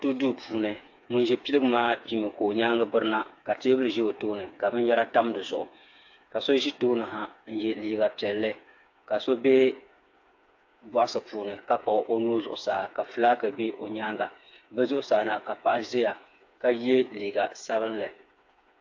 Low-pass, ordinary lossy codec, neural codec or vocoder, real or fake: 7.2 kHz; AAC, 48 kbps; codec, 16 kHz, 4 kbps, FreqCodec, smaller model; fake